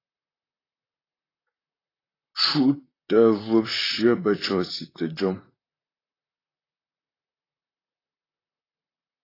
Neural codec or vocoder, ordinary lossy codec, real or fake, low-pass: vocoder, 44.1 kHz, 128 mel bands, Pupu-Vocoder; AAC, 24 kbps; fake; 5.4 kHz